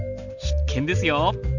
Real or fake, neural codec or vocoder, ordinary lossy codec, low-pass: real; none; none; 7.2 kHz